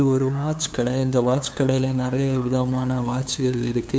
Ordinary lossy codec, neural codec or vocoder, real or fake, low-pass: none; codec, 16 kHz, 2 kbps, FunCodec, trained on LibriTTS, 25 frames a second; fake; none